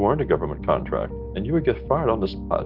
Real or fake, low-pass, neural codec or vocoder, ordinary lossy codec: real; 5.4 kHz; none; Opus, 24 kbps